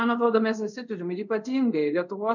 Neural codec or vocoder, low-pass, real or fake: codec, 24 kHz, 0.5 kbps, DualCodec; 7.2 kHz; fake